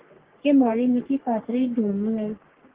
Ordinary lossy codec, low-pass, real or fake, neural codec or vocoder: Opus, 16 kbps; 3.6 kHz; fake; codec, 44.1 kHz, 3.4 kbps, Pupu-Codec